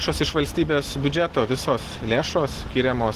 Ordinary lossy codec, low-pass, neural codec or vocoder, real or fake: Opus, 16 kbps; 14.4 kHz; none; real